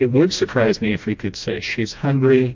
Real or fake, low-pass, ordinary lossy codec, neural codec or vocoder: fake; 7.2 kHz; MP3, 48 kbps; codec, 16 kHz, 1 kbps, FreqCodec, smaller model